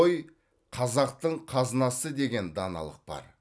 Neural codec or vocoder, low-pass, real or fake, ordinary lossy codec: none; none; real; none